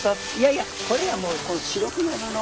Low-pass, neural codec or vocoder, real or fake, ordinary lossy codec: none; none; real; none